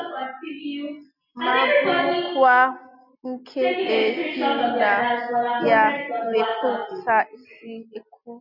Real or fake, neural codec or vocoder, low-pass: real; none; 5.4 kHz